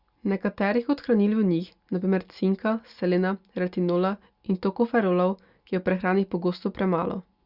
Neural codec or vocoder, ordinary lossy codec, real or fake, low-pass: none; Opus, 64 kbps; real; 5.4 kHz